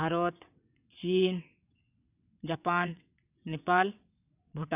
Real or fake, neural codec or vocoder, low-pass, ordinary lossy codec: fake; vocoder, 22.05 kHz, 80 mel bands, WaveNeXt; 3.6 kHz; none